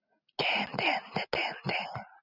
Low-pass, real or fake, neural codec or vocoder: 5.4 kHz; real; none